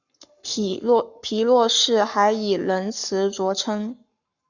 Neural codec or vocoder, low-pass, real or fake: codec, 44.1 kHz, 7.8 kbps, Pupu-Codec; 7.2 kHz; fake